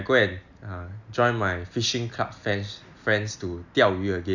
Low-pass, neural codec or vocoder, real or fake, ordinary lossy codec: 7.2 kHz; none; real; none